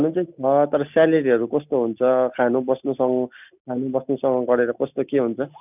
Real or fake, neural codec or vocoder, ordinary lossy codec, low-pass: real; none; none; 3.6 kHz